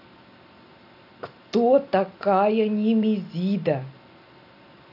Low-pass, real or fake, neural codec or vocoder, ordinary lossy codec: 5.4 kHz; real; none; AAC, 48 kbps